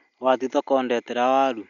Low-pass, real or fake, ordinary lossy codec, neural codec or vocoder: 7.2 kHz; real; none; none